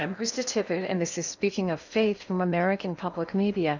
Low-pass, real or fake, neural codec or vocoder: 7.2 kHz; fake; codec, 16 kHz in and 24 kHz out, 0.8 kbps, FocalCodec, streaming, 65536 codes